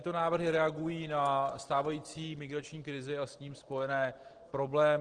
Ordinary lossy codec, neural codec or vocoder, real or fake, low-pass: Opus, 16 kbps; none; real; 9.9 kHz